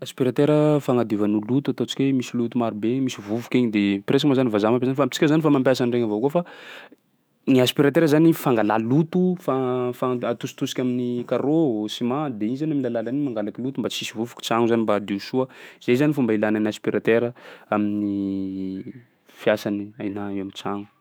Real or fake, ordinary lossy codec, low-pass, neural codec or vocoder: fake; none; none; autoencoder, 48 kHz, 128 numbers a frame, DAC-VAE, trained on Japanese speech